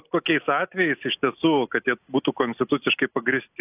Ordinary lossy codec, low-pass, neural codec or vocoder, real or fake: AAC, 32 kbps; 3.6 kHz; none; real